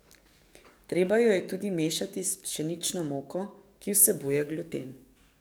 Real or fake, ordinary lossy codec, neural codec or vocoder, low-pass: fake; none; codec, 44.1 kHz, 7.8 kbps, DAC; none